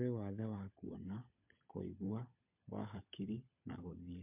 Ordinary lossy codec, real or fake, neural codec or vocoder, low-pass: MP3, 32 kbps; fake; codec, 16 kHz, 16 kbps, FunCodec, trained on LibriTTS, 50 frames a second; 3.6 kHz